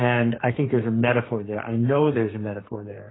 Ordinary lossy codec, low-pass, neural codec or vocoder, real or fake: AAC, 16 kbps; 7.2 kHz; codec, 32 kHz, 1.9 kbps, SNAC; fake